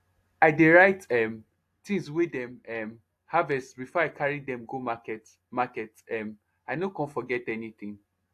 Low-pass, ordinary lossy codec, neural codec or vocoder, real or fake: 14.4 kHz; AAC, 64 kbps; none; real